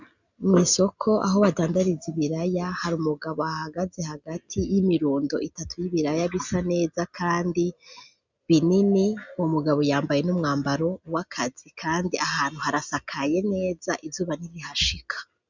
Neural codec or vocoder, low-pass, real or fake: none; 7.2 kHz; real